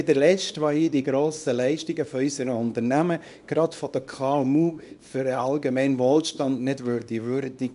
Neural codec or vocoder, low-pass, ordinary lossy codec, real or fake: codec, 24 kHz, 0.9 kbps, WavTokenizer, small release; 10.8 kHz; none; fake